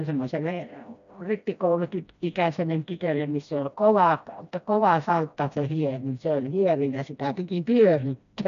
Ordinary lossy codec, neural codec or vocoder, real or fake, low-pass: none; codec, 16 kHz, 1 kbps, FreqCodec, smaller model; fake; 7.2 kHz